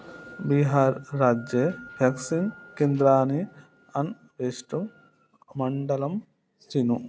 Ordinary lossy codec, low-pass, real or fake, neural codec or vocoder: none; none; real; none